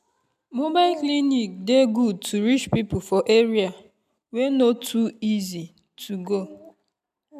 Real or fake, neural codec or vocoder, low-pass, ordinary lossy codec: real; none; 14.4 kHz; none